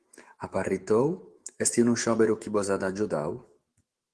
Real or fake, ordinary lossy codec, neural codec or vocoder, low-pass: fake; Opus, 16 kbps; autoencoder, 48 kHz, 128 numbers a frame, DAC-VAE, trained on Japanese speech; 10.8 kHz